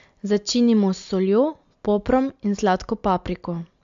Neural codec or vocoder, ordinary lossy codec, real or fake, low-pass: none; AAC, 64 kbps; real; 7.2 kHz